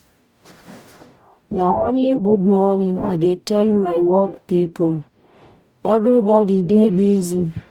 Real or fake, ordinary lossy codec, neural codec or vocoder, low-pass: fake; Opus, 64 kbps; codec, 44.1 kHz, 0.9 kbps, DAC; 19.8 kHz